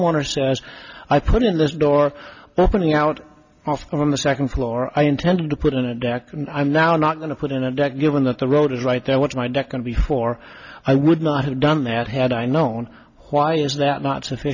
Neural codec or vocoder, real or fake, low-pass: none; real; 7.2 kHz